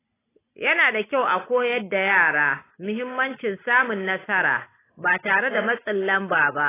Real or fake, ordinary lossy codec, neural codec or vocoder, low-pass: real; AAC, 16 kbps; none; 3.6 kHz